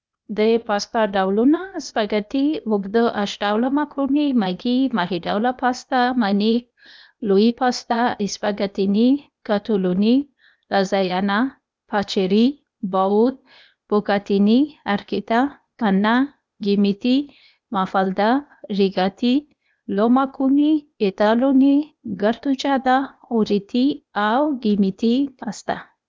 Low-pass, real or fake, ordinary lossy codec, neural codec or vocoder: none; fake; none; codec, 16 kHz, 0.8 kbps, ZipCodec